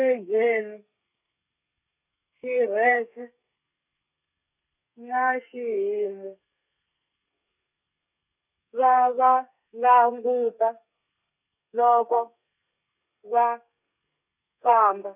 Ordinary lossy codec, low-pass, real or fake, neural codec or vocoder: none; 3.6 kHz; fake; codec, 32 kHz, 1.9 kbps, SNAC